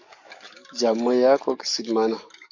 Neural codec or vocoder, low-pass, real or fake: codec, 44.1 kHz, 7.8 kbps, DAC; 7.2 kHz; fake